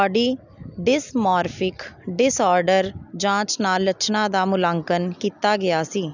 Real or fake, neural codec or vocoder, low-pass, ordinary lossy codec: real; none; 7.2 kHz; none